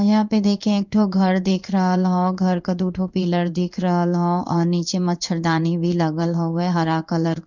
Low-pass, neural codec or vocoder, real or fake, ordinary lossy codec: 7.2 kHz; codec, 16 kHz in and 24 kHz out, 1 kbps, XY-Tokenizer; fake; none